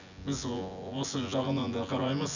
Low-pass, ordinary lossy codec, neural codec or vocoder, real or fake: 7.2 kHz; none; vocoder, 24 kHz, 100 mel bands, Vocos; fake